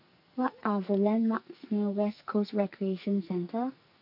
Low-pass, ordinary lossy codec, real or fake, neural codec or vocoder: 5.4 kHz; none; fake; codec, 44.1 kHz, 2.6 kbps, SNAC